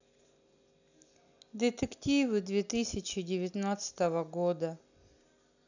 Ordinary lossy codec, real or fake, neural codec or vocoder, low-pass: none; real; none; 7.2 kHz